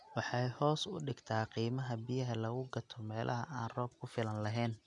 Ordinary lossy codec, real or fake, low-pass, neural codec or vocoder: none; real; 10.8 kHz; none